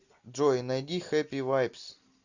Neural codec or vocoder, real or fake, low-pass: none; real; 7.2 kHz